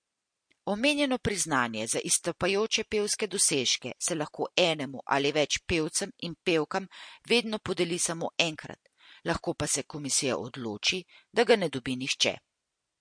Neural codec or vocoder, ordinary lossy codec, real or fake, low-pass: none; MP3, 48 kbps; real; 9.9 kHz